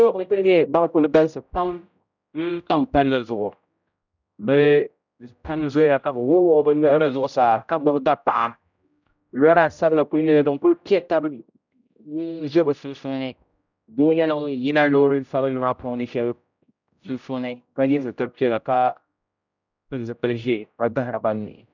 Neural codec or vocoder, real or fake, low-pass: codec, 16 kHz, 0.5 kbps, X-Codec, HuBERT features, trained on general audio; fake; 7.2 kHz